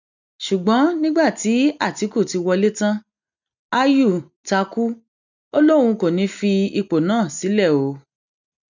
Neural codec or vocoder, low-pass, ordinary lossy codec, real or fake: none; 7.2 kHz; none; real